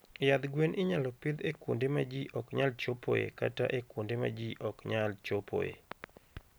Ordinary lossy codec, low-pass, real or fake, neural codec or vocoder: none; none; real; none